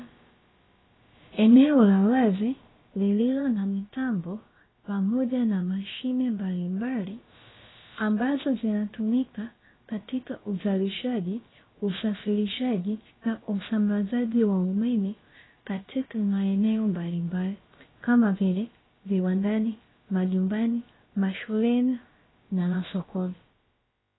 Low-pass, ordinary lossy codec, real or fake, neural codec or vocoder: 7.2 kHz; AAC, 16 kbps; fake; codec, 16 kHz, about 1 kbps, DyCAST, with the encoder's durations